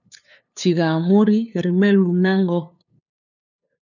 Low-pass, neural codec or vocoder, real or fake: 7.2 kHz; codec, 16 kHz, 2 kbps, FunCodec, trained on LibriTTS, 25 frames a second; fake